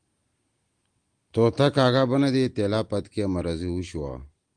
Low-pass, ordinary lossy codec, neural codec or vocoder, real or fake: 9.9 kHz; Opus, 24 kbps; none; real